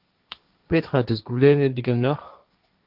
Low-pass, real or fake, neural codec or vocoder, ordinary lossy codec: 5.4 kHz; fake; codec, 16 kHz, 1.1 kbps, Voila-Tokenizer; Opus, 24 kbps